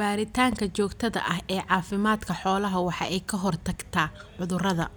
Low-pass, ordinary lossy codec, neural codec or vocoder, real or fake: none; none; none; real